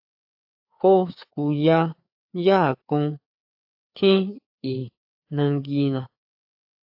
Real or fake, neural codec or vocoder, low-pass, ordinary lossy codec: fake; codec, 16 kHz, 4 kbps, FreqCodec, larger model; 5.4 kHz; AAC, 48 kbps